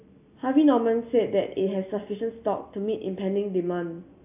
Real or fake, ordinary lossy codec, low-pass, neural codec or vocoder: real; AAC, 32 kbps; 3.6 kHz; none